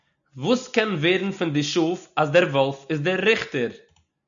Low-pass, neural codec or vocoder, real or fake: 7.2 kHz; none; real